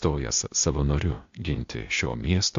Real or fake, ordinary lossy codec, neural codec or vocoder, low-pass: fake; MP3, 48 kbps; codec, 16 kHz, 0.8 kbps, ZipCodec; 7.2 kHz